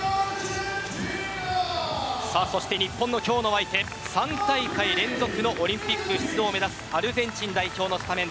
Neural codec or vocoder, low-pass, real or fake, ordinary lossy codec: none; none; real; none